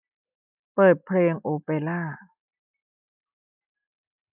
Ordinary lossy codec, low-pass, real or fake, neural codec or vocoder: none; 3.6 kHz; real; none